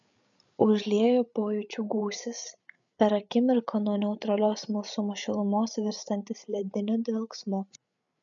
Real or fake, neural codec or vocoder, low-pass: fake; codec, 16 kHz, 8 kbps, FreqCodec, larger model; 7.2 kHz